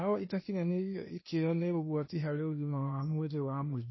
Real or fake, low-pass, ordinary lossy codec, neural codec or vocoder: fake; 7.2 kHz; MP3, 24 kbps; codec, 16 kHz, 0.8 kbps, ZipCodec